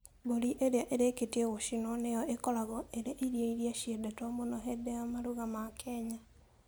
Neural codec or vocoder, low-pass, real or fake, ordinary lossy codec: none; none; real; none